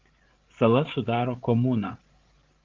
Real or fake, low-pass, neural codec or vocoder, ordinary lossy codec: fake; 7.2 kHz; codec, 16 kHz, 16 kbps, FreqCodec, larger model; Opus, 16 kbps